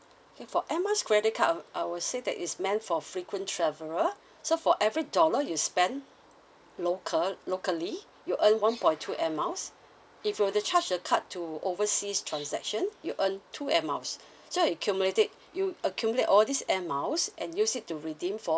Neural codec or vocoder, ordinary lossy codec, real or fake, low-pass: none; none; real; none